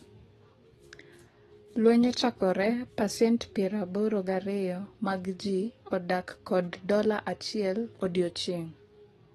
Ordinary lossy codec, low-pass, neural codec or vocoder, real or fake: AAC, 32 kbps; 19.8 kHz; codec, 44.1 kHz, 7.8 kbps, DAC; fake